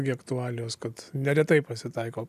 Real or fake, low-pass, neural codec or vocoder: real; 14.4 kHz; none